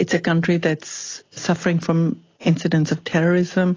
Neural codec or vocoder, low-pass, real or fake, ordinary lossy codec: none; 7.2 kHz; real; AAC, 32 kbps